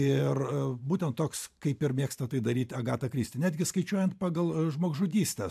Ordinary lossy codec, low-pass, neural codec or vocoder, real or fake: AAC, 96 kbps; 14.4 kHz; vocoder, 44.1 kHz, 128 mel bands every 512 samples, BigVGAN v2; fake